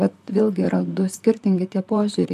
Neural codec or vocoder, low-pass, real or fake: vocoder, 44.1 kHz, 128 mel bands, Pupu-Vocoder; 14.4 kHz; fake